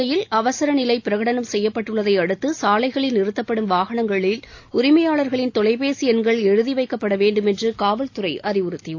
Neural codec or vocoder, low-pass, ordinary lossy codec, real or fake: none; 7.2 kHz; AAC, 48 kbps; real